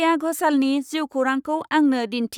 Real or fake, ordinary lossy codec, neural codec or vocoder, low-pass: fake; none; codec, 44.1 kHz, 7.8 kbps, Pupu-Codec; 19.8 kHz